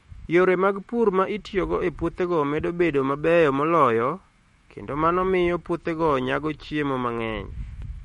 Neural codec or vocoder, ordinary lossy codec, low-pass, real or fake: autoencoder, 48 kHz, 128 numbers a frame, DAC-VAE, trained on Japanese speech; MP3, 48 kbps; 19.8 kHz; fake